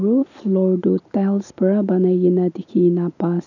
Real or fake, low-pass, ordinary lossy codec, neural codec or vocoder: real; 7.2 kHz; none; none